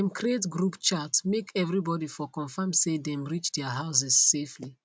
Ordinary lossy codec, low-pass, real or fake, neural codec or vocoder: none; none; real; none